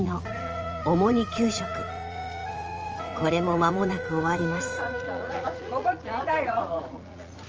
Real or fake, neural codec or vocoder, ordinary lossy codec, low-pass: fake; vocoder, 44.1 kHz, 80 mel bands, Vocos; Opus, 24 kbps; 7.2 kHz